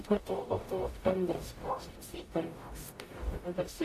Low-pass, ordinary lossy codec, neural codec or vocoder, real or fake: 14.4 kHz; AAC, 48 kbps; codec, 44.1 kHz, 0.9 kbps, DAC; fake